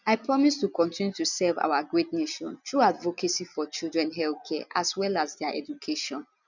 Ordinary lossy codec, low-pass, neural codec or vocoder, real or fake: none; 7.2 kHz; none; real